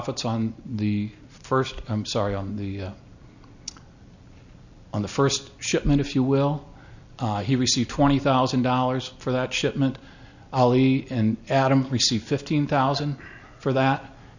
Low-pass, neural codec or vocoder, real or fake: 7.2 kHz; none; real